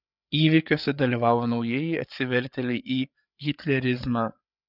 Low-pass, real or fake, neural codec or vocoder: 5.4 kHz; fake; codec, 16 kHz, 8 kbps, FreqCodec, larger model